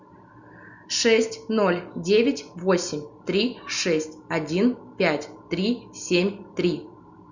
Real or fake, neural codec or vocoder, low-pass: real; none; 7.2 kHz